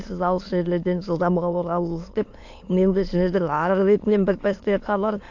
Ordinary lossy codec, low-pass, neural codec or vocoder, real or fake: AAC, 48 kbps; 7.2 kHz; autoencoder, 22.05 kHz, a latent of 192 numbers a frame, VITS, trained on many speakers; fake